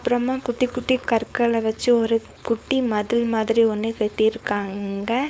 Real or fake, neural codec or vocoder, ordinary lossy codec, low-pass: fake; codec, 16 kHz, 4.8 kbps, FACodec; none; none